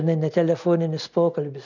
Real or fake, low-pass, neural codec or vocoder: real; 7.2 kHz; none